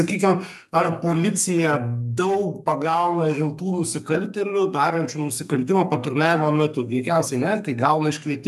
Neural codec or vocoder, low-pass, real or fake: codec, 32 kHz, 1.9 kbps, SNAC; 14.4 kHz; fake